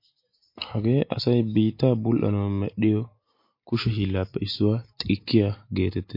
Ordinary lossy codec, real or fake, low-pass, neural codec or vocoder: MP3, 32 kbps; real; 5.4 kHz; none